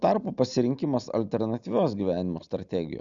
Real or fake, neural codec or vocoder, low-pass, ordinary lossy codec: real; none; 7.2 kHz; Opus, 64 kbps